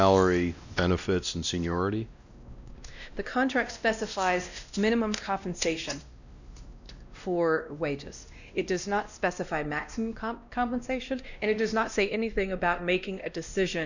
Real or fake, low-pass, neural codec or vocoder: fake; 7.2 kHz; codec, 16 kHz, 1 kbps, X-Codec, WavLM features, trained on Multilingual LibriSpeech